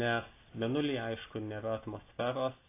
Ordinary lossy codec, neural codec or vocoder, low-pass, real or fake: AAC, 24 kbps; none; 3.6 kHz; real